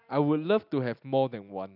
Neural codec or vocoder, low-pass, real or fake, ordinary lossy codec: none; 5.4 kHz; real; none